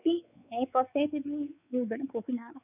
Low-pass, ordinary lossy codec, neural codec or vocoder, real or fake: 3.6 kHz; none; codec, 16 kHz, 4 kbps, X-Codec, WavLM features, trained on Multilingual LibriSpeech; fake